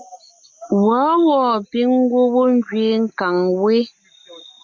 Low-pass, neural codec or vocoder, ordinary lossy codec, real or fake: 7.2 kHz; none; MP3, 64 kbps; real